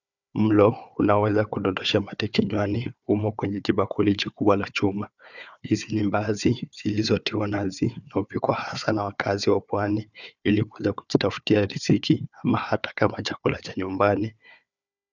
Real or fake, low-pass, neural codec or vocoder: fake; 7.2 kHz; codec, 16 kHz, 4 kbps, FunCodec, trained on Chinese and English, 50 frames a second